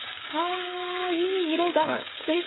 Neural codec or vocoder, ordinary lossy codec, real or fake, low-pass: codec, 16 kHz, 16 kbps, FreqCodec, smaller model; AAC, 16 kbps; fake; 7.2 kHz